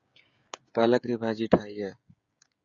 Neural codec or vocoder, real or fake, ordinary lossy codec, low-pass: codec, 16 kHz, 16 kbps, FreqCodec, smaller model; fake; Opus, 64 kbps; 7.2 kHz